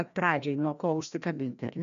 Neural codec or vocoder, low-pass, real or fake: codec, 16 kHz, 1 kbps, FreqCodec, larger model; 7.2 kHz; fake